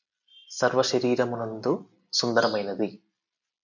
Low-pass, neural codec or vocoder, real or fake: 7.2 kHz; none; real